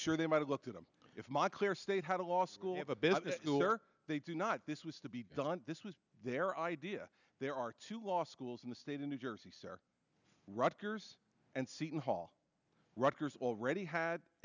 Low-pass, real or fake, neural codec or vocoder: 7.2 kHz; real; none